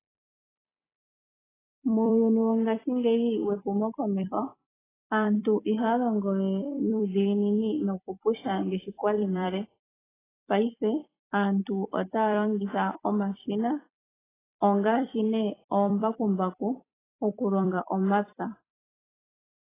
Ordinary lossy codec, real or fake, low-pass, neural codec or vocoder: AAC, 16 kbps; fake; 3.6 kHz; vocoder, 44.1 kHz, 128 mel bands every 256 samples, BigVGAN v2